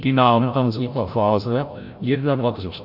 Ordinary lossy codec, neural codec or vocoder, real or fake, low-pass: AAC, 48 kbps; codec, 16 kHz, 0.5 kbps, FreqCodec, larger model; fake; 5.4 kHz